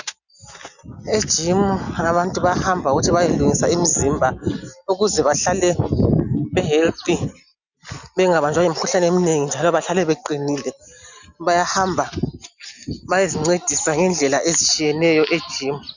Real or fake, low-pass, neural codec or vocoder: real; 7.2 kHz; none